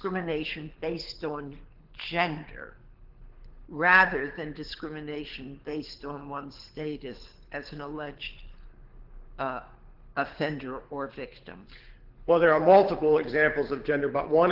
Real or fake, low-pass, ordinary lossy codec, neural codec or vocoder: fake; 5.4 kHz; Opus, 16 kbps; codec, 24 kHz, 6 kbps, HILCodec